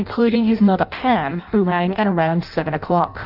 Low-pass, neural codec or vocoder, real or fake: 5.4 kHz; codec, 16 kHz in and 24 kHz out, 0.6 kbps, FireRedTTS-2 codec; fake